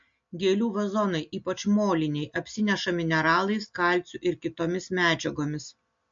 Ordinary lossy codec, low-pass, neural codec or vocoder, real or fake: MP3, 48 kbps; 7.2 kHz; none; real